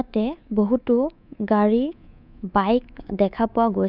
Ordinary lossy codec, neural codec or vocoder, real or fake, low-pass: none; codec, 24 kHz, 3.1 kbps, DualCodec; fake; 5.4 kHz